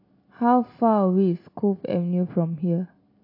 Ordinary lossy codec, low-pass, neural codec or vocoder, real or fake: MP3, 32 kbps; 5.4 kHz; none; real